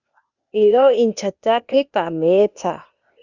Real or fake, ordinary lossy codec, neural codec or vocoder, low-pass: fake; Opus, 64 kbps; codec, 16 kHz, 0.8 kbps, ZipCodec; 7.2 kHz